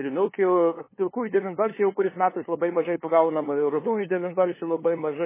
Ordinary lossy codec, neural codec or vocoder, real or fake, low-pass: MP3, 16 kbps; codec, 16 kHz, 2 kbps, FunCodec, trained on LibriTTS, 25 frames a second; fake; 3.6 kHz